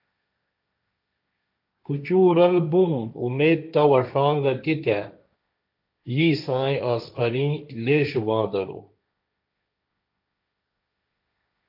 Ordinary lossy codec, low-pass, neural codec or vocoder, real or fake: AAC, 48 kbps; 5.4 kHz; codec, 16 kHz, 1.1 kbps, Voila-Tokenizer; fake